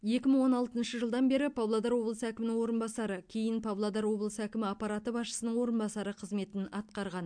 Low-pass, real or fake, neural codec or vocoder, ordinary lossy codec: 9.9 kHz; real; none; MP3, 64 kbps